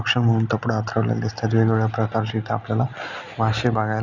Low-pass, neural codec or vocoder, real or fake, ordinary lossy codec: 7.2 kHz; none; real; none